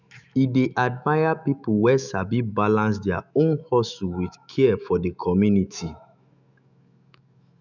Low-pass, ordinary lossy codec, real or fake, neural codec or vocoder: 7.2 kHz; none; fake; autoencoder, 48 kHz, 128 numbers a frame, DAC-VAE, trained on Japanese speech